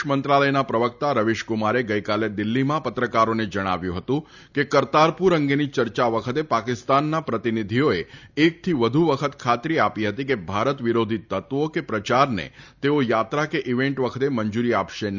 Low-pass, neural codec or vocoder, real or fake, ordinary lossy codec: 7.2 kHz; none; real; none